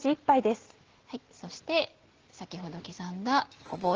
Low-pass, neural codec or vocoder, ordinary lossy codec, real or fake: 7.2 kHz; none; Opus, 16 kbps; real